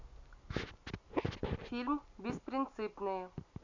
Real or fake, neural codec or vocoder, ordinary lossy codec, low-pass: real; none; MP3, 64 kbps; 7.2 kHz